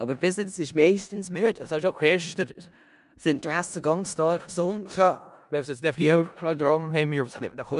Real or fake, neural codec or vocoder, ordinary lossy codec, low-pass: fake; codec, 16 kHz in and 24 kHz out, 0.4 kbps, LongCat-Audio-Codec, four codebook decoder; none; 10.8 kHz